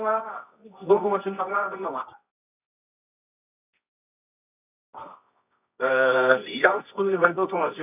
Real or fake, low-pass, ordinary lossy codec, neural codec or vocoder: fake; 3.6 kHz; none; codec, 24 kHz, 0.9 kbps, WavTokenizer, medium music audio release